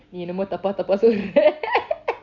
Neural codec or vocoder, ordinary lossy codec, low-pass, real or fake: none; none; 7.2 kHz; real